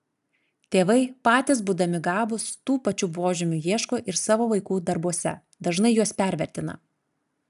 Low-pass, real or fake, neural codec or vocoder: 14.4 kHz; real; none